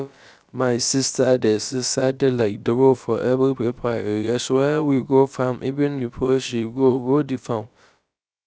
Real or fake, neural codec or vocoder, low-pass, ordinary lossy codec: fake; codec, 16 kHz, about 1 kbps, DyCAST, with the encoder's durations; none; none